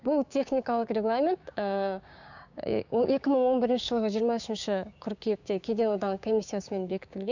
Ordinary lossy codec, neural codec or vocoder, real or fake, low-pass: none; codec, 16 kHz, 6 kbps, DAC; fake; 7.2 kHz